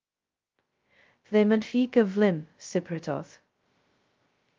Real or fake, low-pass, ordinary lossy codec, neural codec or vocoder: fake; 7.2 kHz; Opus, 24 kbps; codec, 16 kHz, 0.2 kbps, FocalCodec